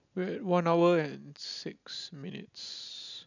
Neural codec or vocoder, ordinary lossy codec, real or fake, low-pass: none; none; real; 7.2 kHz